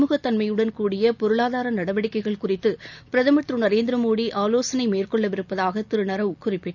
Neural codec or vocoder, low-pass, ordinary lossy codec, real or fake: none; 7.2 kHz; none; real